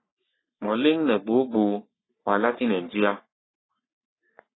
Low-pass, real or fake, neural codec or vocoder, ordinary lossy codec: 7.2 kHz; fake; codec, 44.1 kHz, 3.4 kbps, Pupu-Codec; AAC, 16 kbps